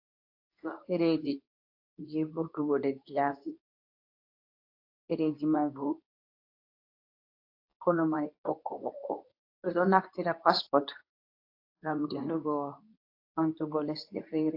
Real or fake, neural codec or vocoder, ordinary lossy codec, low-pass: fake; codec, 24 kHz, 0.9 kbps, WavTokenizer, medium speech release version 2; AAC, 32 kbps; 5.4 kHz